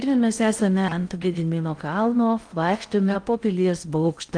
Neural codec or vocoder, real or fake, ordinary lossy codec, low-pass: codec, 16 kHz in and 24 kHz out, 0.6 kbps, FocalCodec, streaming, 4096 codes; fake; Opus, 32 kbps; 9.9 kHz